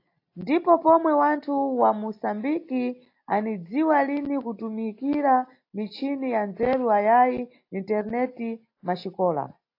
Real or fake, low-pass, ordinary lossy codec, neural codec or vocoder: real; 5.4 kHz; AAC, 32 kbps; none